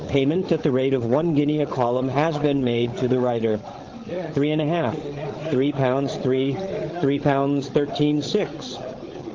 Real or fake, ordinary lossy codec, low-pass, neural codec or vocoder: fake; Opus, 16 kbps; 7.2 kHz; codec, 16 kHz, 16 kbps, FreqCodec, larger model